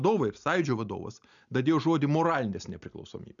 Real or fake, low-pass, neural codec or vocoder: real; 7.2 kHz; none